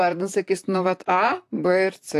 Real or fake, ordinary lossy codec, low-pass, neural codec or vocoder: fake; AAC, 64 kbps; 14.4 kHz; vocoder, 44.1 kHz, 128 mel bands, Pupu-Vocoder